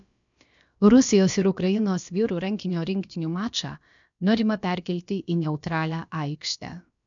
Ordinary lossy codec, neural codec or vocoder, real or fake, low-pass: MP3, 96 kbps; codec, 16 kHz, about 1 kbps, DyCAST, with the encoder's durations; fake; 7.2 kHz